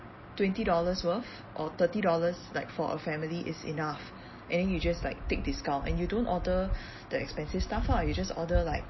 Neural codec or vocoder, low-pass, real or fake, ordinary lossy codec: none; 7.2 kHz; real; MP3, 24 kbps